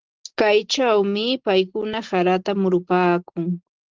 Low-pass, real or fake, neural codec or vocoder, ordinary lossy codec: 7.2 kHz; real; none; Opus, 16 kbps